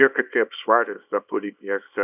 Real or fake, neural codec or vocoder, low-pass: fake; codec, 24 kHz, 0.9 kbps, WavTokenizer, small release; 3.6 kHz